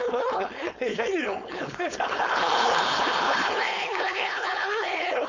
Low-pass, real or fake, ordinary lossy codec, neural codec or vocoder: 7.2 kHz; fake; MP3, 64 kbps; codec, 16 kHz, 4.8 kbps, FACodec